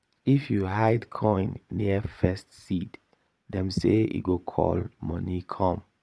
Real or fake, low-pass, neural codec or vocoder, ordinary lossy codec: fake; none; vocoder, 22.05 kHz, 80 mel bands, Vocos; none